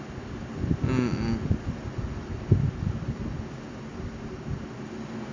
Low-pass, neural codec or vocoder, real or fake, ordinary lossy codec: 7.2 kHz; none; real; MP3, 64 kbps